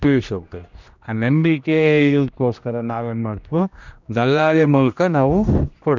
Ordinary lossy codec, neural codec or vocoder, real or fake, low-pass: none; codec, 16 kHz, 1 kbps, X-Codec, HuBERT features, trained on general audio; fake; 7.2 kHz